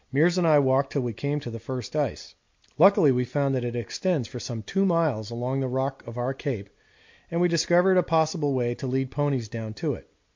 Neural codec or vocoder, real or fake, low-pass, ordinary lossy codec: none; real; 7.2 kHz; MP3, 48 kbps